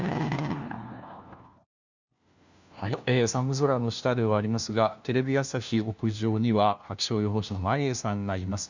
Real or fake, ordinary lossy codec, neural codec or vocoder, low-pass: fake; Opus, 64 kbps; codec, 16 kHz, 1 kbps, FunCodec, trained on LibriTTS, 50 frames a second; 7.2 kHz